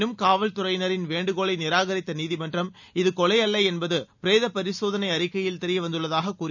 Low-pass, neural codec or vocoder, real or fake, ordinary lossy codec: 7.2 kHz; none; real; none